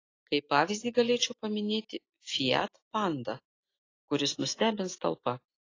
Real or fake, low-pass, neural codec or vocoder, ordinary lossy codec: real; 7.2 kHz; none; AAC, 32 kbps